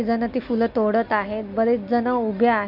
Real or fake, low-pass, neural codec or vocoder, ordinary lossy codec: real; 5.4 kHz; none; none